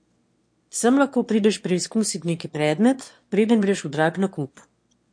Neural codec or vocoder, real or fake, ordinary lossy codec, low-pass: autoencoder, 22.05 kHz, a latent of 192 numbers a frame, VITS, trained on one speaker; fake; MP3, 48 kbps; 9.9 kHz